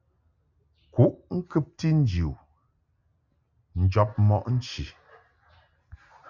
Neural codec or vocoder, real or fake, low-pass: none; real; 7.2 kHz